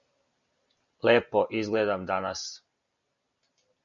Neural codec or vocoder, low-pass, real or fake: none; 7.2 kHz; real